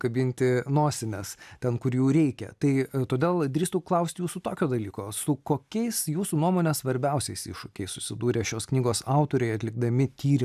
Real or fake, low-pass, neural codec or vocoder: real; 14.4 kHz; none